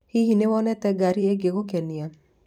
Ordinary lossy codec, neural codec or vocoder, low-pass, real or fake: none; vocoder, 44.1 kHz, 128 mel bands every 512 samples, BigVGAN v2; 19.8 kHz; fake